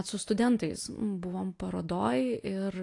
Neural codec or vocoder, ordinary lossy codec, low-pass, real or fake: none; AAC, 48 kbps; 10.8 kHz; real